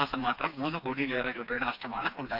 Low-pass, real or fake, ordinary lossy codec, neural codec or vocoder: 5.4 kHz; fake; none; codec, 16 kHz, 2 kbps, FreqCodec, smaller model